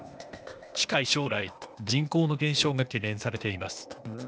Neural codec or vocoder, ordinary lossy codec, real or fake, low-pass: codec, 16 kHz, 0.8 kbps, ZipCodec; none; fake; none